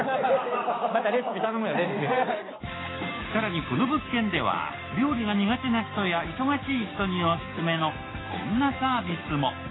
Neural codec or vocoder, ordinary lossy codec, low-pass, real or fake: codec, 16 kHz, 6 kbps, DAC; AAC, 16 kbps; 7.2 kHz; fake